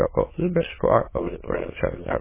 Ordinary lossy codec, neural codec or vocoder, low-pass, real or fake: MP3, 16 kbps; autoencoder, 22.05 kHz, a latent of 192 numbers a frame, VITS, trained on many speakers; 3.6 kHz; fake